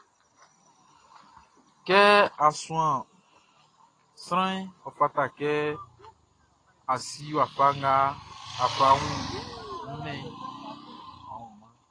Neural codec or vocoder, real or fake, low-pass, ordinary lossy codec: none; real; 9.9 kHz; AAC, 32 kbps